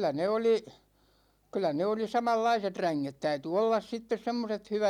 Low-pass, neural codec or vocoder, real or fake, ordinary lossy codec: 19.8 kHz; none; real; none